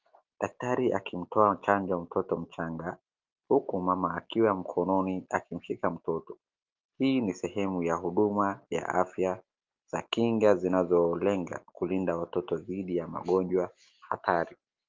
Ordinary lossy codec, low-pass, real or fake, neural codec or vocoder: Opus, 24 kbps; 7.2 kHz; real; none